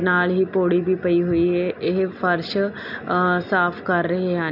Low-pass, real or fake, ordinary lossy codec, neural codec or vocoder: 5.4 kHz; real; none; none